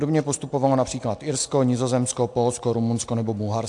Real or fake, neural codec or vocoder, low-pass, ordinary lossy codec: real; none; 10.8 kHz; AAC, 64 kbps